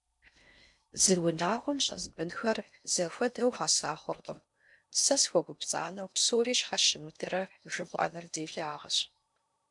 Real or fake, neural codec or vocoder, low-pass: fake; codec, 16 kHz in and 24 kHz out, 0.6 kbps, FocalCodec, streaming, 4096 codes; 10.8 kHz